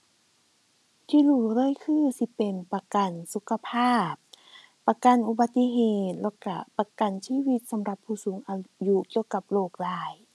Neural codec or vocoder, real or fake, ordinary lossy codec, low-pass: none; real; none; none